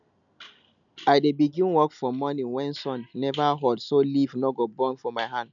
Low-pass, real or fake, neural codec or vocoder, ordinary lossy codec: 7.2 kHz; real; none; none